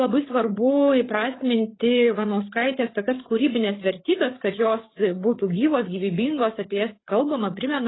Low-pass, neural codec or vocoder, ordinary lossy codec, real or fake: 7.2 kHz; codec, 16 kHz, 8 kbps, FreqCodec, larger model; AAC, 16 kbps; fake